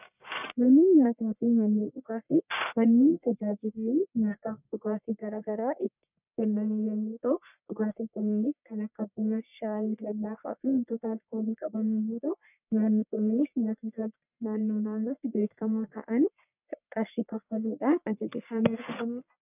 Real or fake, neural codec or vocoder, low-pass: fake; codec, 44.1 kHz, 1.7 kbps, Pupu-Codec; 3.6 kHz